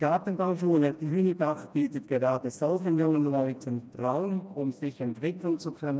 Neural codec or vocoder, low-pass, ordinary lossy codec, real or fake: codec, 16 kHz, 1 kbps, FreqCodec, smaller model; none; none; fake